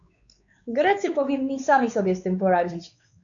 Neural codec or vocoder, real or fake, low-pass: codec, 16 kHz, 4 kbps, X-Codec, WavLM features, trained on Multilingual LibriSpeech; fake; 7.2 kHz